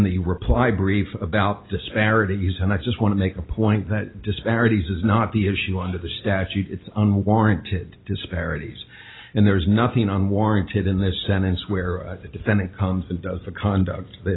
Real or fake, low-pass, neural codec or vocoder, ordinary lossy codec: real; 7.2 kHz; none; AAC, 16 kbps